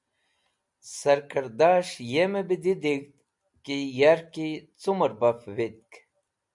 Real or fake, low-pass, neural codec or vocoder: real; 10.8 kHz; none